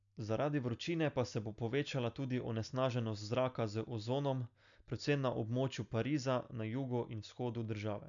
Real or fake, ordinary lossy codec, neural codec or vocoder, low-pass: real; none; none; 7.2 kHz